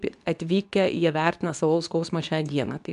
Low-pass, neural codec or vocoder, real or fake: 10.8 kHz; codec, 24 kHz, 0.9 kbps, WavTokenizer, medium speech release version 2; fake